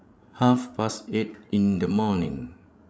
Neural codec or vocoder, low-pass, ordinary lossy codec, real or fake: codec, 16 kHz, 16 kbps, FreqCodec, larger model; none; none; fake